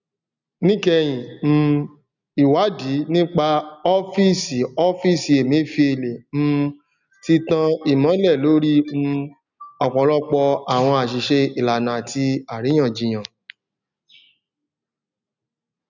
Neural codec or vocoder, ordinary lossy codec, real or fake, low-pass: none; none; real; 7.2 kHz